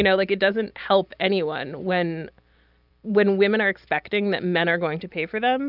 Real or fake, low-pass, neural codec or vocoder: real; 5.4 kHz; none